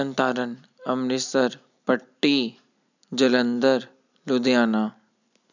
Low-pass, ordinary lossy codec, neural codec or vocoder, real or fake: 7.2 kHz; none; none; real